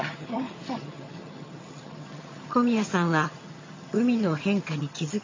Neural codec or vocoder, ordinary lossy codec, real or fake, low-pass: vocoder, 22.05 kHz, 80 mel bands, HiFi-GAN; MP3, 32 kbps; fake; 7.2 kHz